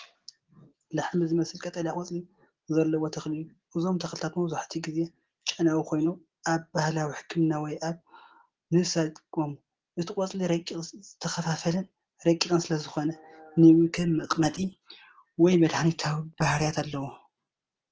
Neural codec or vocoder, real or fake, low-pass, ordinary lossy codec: none; real; 7.2 kHz; Opus, 16 kbps